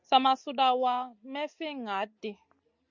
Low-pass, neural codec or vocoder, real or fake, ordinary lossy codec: 7.2 kHz; none; real; Opus, 64 kbps